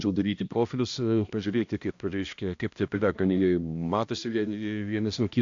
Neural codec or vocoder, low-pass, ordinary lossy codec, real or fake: codec, 16 kHz, 1 kbps, X-Codec, HuBERT features, trained on balanced general audio; 7.2 kHz; AAC, 48 kbps; fake